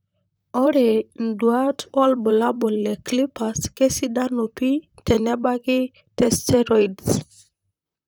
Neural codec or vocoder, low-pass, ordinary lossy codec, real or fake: vocoder, 44.1 kHz, 128 mel bands, Pupu-Vocoder; none; none; fake